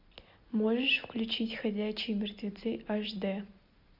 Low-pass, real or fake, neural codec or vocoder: 5.4 kHz; real; none